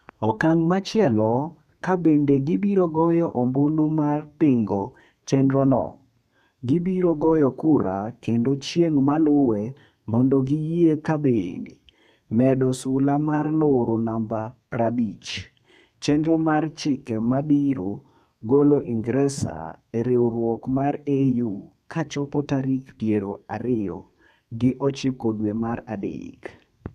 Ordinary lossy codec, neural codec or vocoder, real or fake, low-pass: none; codec, 32 kHz, 1.9 kbps, SNAC; fake; 14.4 kHz